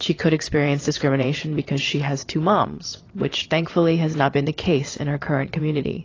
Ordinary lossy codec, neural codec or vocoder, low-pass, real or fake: AAC, 32 kbps; vocoder, 22.05 kHz, 80 mel bands, WaveNeXt; 7.2 kHz; fake